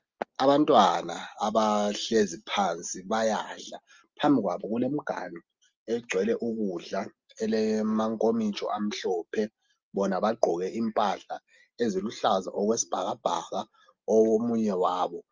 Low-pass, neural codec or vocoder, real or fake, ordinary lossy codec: 7.2 kHz; none; real; Opus, 32 kbps